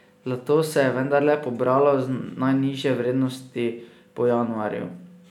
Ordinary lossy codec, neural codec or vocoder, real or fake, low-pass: none; none; real; 19.8 kHz